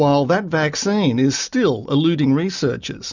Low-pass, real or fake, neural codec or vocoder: 7.2 kHz; real; none